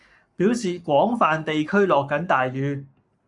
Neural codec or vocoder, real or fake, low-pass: codec, 44.1 kHz, 7.8 kbps, Pupu-Codec; fake; 10.8 kHz